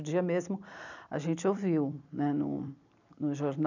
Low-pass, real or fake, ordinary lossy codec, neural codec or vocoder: 7.2 kHz; fake; none; vocoder, 44.1 kHz, 128 mel bands every 256 samples, BigVGAN v2